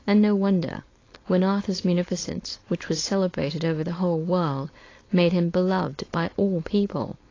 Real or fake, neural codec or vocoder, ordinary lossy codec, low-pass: fake; codec, 16 kHz, 4.8 kbps, FACodec; AAC, 32 kbps; 7.2 kHz